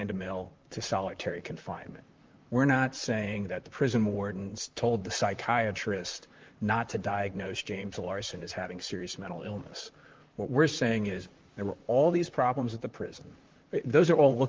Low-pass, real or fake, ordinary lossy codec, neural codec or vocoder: 7.2 kHz; fake; Opus, 32 kbps; vocoder, 44.1 kHz, 128 mel bands, Pupu-Vocoder